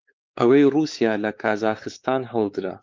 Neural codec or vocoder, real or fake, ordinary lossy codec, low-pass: codec, 16 kHz, 4 kbps, X-Codec, WavLM features, trained on Multilingual LibriSpeech; fake; Opus, 24 kbps; 7.2 kHz